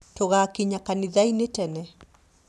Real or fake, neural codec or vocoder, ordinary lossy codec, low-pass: real; none; none; none